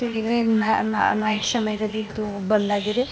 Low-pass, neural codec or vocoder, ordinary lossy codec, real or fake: none; codec, 16 kHz, 0.8 kbps, ZipCodec; none; fake